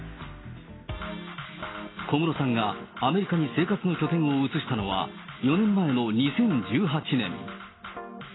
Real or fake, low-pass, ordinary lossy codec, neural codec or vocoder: real; 7.2 kHz; AAC, 16 kbps; none